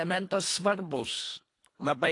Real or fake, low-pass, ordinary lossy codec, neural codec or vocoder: fake; 10.8 kHz; AAC, 48 kbps; codec, 24 kHz, 1.5 kbps, HILCodec